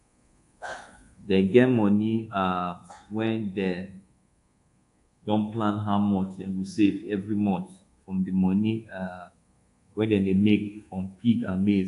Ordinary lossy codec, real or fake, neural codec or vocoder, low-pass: AAC, 64 kbps; fake; codec, 24 kHz, 1.2 kbps, DualCodec; 10.8 kHz